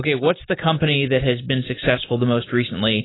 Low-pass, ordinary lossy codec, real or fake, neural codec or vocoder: 7.2 kHz; AAC, 16 kbps; real; none